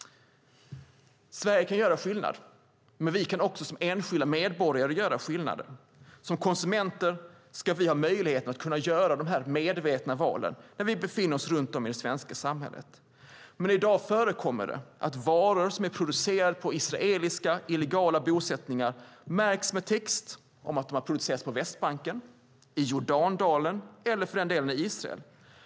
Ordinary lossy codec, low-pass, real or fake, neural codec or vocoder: none; none; real; none